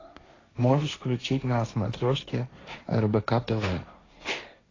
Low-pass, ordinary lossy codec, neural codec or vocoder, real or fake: 7.2 kHz; AAC, 32 kbps; codec, 16 kHz, 1.1 kbps, Voila-Tokenizer; fake